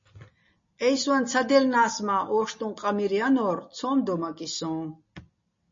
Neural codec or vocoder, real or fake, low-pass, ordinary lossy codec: none; real; 7.2 kHz; MP3, 32 kbps